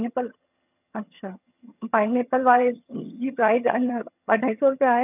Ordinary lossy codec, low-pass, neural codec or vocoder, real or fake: none; 3.6 kHz; vocoder, 22.05 kHz, 80 mel bands, HiFi-GAN; fake